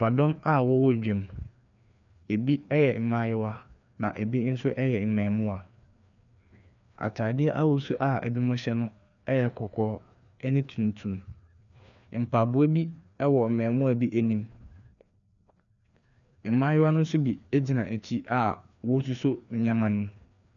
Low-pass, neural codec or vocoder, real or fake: 7.2 kHz; codec, 16 kHz, 2 kbps, FreqCodec, larger model; fake